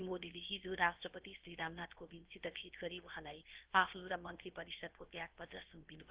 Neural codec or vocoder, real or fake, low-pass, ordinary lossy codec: codec, 16 kHz, 0.7 kbps, FocalCodec; fake; 3.6 kHz; Opus, 16 kbps